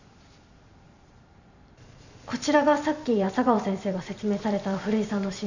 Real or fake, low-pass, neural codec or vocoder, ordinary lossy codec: fake; 7.2 kHz; vocoder, 44.1 kHz, 128 mel bands every 512 samples, BigVGAN v2; none